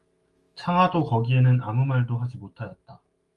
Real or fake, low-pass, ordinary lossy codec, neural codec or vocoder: fake; 10.8 kHz; Opus, 32 kbps; autoencoder, 48 kHz, 128 numbers a frame, DAC-VAE, trained on Japanese speech